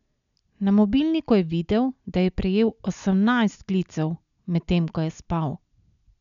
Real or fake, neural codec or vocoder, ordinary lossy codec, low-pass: real; none; none; 7.2 kHz